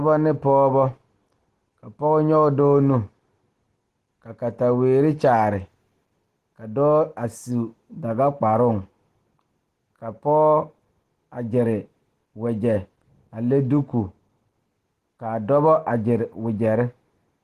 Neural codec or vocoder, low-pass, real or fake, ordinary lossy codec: none; 14.4 kHz; real; Opus, 16 kbps